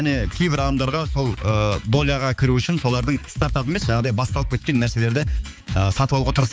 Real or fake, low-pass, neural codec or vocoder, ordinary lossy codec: fake; none; codec, 16 kHz, 4 kbps, X-Codec, HuBERT features, trained on balanced general audio; none